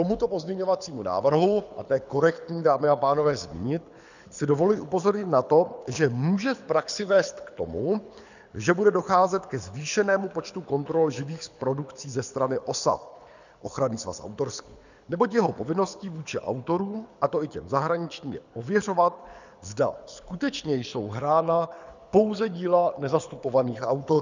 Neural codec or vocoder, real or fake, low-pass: codec, 24 kHz, 6 kbps, HILCodec; fake; 7.2 kHz